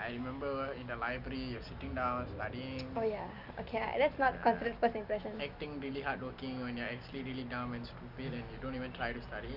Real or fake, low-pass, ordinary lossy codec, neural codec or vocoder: real; 5.4 kHz; none; none